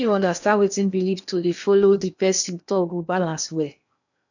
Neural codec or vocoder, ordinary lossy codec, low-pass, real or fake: codec, 16 kHz in and 24 kHz out, 0.8 kbps, FocalCodec, streaming, 65536 codes; none; 7.2 kHz; fake